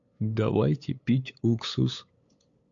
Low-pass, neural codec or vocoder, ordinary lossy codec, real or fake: 7.2 kHz; codec, 16 kHz, 8 kbps, FunCodec, trained on LibriTTS, 25 frames a second; MP3, 48 kbps; fake